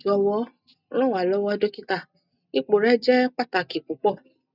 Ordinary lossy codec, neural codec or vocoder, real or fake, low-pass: none; none; real; 5.4 kHz